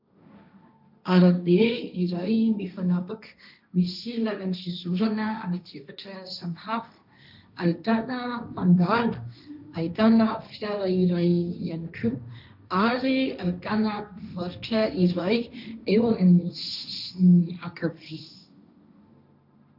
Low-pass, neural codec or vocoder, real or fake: 5.4 kHz; codec, 16 kHz, 1.1 kbps, Voila-Tokenizer; fake